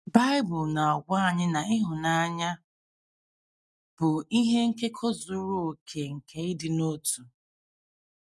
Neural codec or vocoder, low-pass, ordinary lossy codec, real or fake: none; none; none; real